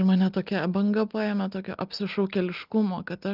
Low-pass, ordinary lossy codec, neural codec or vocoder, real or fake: 5.4 kHz; Opus, 32 kbps; none; real